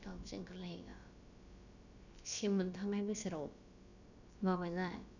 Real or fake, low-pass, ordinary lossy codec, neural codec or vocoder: fake; 7.2 kHz; none; codec, 16 kHz, about 1 kbps, DyCAST, with the encoder's durations